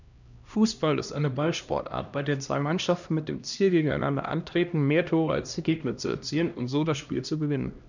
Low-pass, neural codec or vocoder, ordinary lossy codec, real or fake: 7.2 kHz; codec, 16 kHz, 1 kbps, X-Codec, HuBERT features, trained on LibriSpeech; none; fake